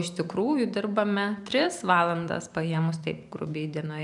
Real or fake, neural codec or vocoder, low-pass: fake; vocoder, 44.1 kHz, 128 mel bands every 512 samples, BigVGAN v2; 10.8 kHz